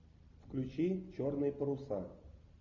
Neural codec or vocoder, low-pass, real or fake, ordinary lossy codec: none; 7.2 kHz; real; MP3, 64 kbps